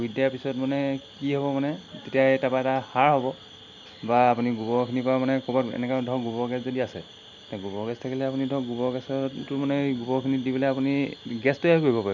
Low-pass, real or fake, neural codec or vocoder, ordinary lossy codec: 7.2 kHz; real; none; none